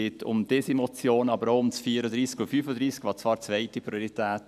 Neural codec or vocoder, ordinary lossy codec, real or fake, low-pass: none; none; real; 14.4 kHz